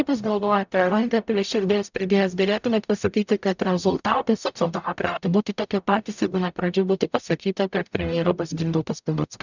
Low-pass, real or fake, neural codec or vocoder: 7.2 kHz; fake; codec, 44.1 kHz, 0.9 kbps, DAC